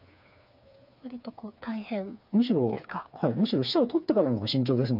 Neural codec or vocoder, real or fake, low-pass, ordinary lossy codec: codec, 16 kHz, 4 kbps, FreqCodec, smaller model; fake; 5.4 kHz; none